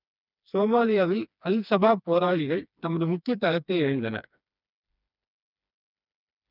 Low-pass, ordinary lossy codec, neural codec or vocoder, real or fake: 5.4 kHz; none; codec, 16 kHz, 2 kbps, FreqCodec, smaller model; fake